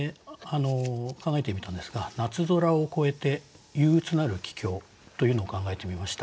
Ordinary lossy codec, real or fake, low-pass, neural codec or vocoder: none; real; none; none